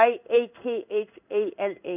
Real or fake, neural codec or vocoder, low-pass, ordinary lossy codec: fake; codec, 16 kHz in and 24 kHz out, 1 kbps, XY-Tokenizer; 3.6 kHz; none